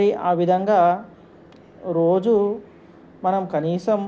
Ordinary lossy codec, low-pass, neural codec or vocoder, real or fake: none; none; none; real